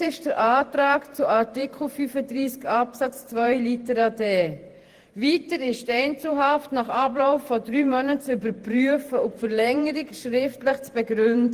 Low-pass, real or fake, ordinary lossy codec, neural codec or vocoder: 14.4 kHz; fake; Opus, 24 kbps; vocoder, 48 kHz, 128 mel bands, Vocos